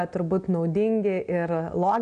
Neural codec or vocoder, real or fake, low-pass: none; real; 9.9 kHz